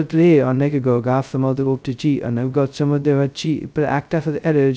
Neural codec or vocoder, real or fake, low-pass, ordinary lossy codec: codec, 16 kHz, 0.2 kbps, FocalCodec; fake; none; none